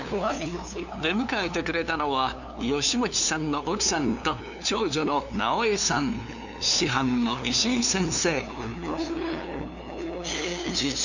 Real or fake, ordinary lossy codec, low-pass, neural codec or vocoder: fake; none; 7.2 kHz; codec, 16 kHz, 2 kbps, FunCodec, trained on LibriTTS, 25 frames a second